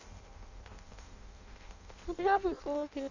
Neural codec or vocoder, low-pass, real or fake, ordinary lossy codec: codec, 16 kHz in and 24 kHz out, 0.6 kbps, FireRedTTS-2 codec; 7.2 kHz; fake; none